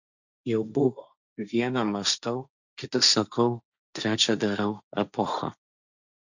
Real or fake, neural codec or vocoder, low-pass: fake; codec, 16 kHz, 1.1 kbps, Voila-Tokenizer; 7.2 kHz